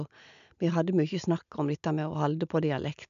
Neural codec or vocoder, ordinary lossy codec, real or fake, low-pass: none; AAC, 96 kbps; real; 7.2 kHz